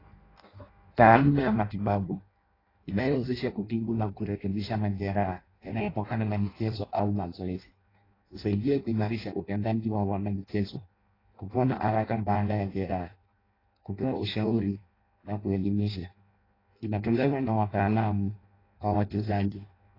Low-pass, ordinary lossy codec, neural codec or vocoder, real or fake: 5.4 kHz; AAC, 24 kbps; codec, 16 kHz in and 24 kHz out, 0.6 kbps, FireRedTTS-2 codec; fake